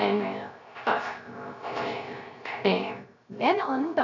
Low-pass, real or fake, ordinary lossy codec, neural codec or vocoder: 7.2 kHz; fake; none; codec, 16 kHz, 0.3 kbps, FocalCodec